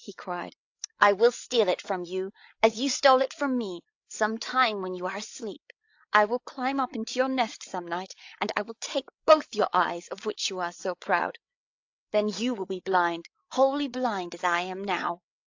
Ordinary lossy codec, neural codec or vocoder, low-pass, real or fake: AAC, 48 kbps; codec, 16 kHz, 8 kbps, FreqCodec, larger model; 7.2 kHz; fake